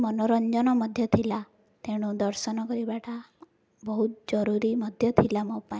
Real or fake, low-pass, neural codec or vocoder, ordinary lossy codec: real; none; none; none